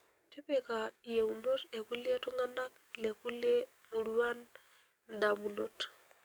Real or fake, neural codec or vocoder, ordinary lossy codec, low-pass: fake; codec, 44.1 kHz, 7.8 kbps, DAC; none; none